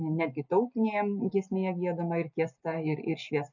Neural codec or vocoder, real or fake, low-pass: none; real; 7.2 kHz